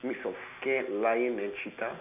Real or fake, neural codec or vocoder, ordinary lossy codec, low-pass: real; none; none; 3.6 kHz